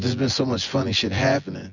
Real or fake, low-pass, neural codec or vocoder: fake; 7.2 kHz; vocoder, 24 kHz, 100 mel bands, Vocos